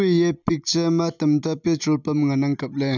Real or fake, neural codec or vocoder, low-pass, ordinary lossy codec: real; none; 7.2 kHz; none